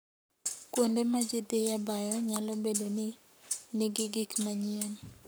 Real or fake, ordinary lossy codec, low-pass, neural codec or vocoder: fake; none; none; codec, 44.1 kHz, 7.8 kbps, Pupu-Codec